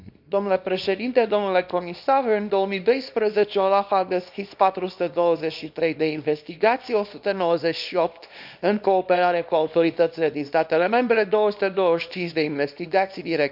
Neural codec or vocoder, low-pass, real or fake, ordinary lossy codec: codec, 24 kHz, 0.9 kbps, WavTokenizer, small release; 5.4 kHz; fake; none